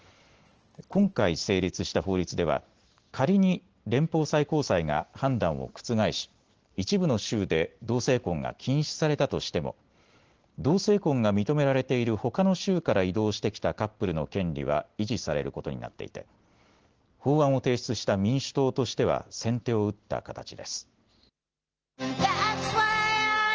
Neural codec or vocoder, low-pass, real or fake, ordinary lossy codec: none; 7.2 kHz; real; Opus, 16 kbps